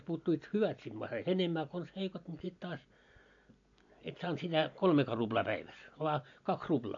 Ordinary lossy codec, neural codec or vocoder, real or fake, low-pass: AAC, 48 kbps; none; real; 7.2 kHz